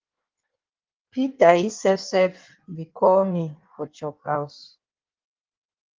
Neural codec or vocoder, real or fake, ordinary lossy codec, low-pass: codec, 16 kHz in and 24 kHz out, 1.1 kbps, FireRedTTS-2 codec; fake; Opus, 32 kbps; 7.2 kHz